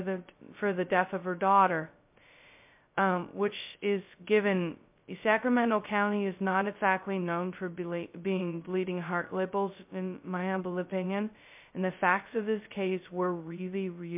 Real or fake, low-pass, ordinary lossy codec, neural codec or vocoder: fake; 3.6 kHz; MP3, 32 kbps; codec, 16 kHz, 0.2 kbps, FocalCodec